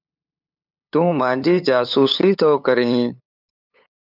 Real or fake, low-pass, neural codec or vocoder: fake; 5.4 kHz; codec, 16 kHz, 8 kbps, FunCodec, trained on LibriTTS, 25 frames a second